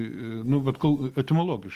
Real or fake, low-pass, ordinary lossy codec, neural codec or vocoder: real; 14.4 kHz; Opus, 24 kbps; none